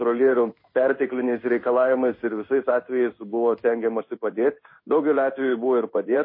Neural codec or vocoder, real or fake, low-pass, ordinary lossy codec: codec, 16 kHz in and 24 kHz out, 1 kbps, XY-Tokenizer; fake; 5.4 kHz; MP3, 24 kbps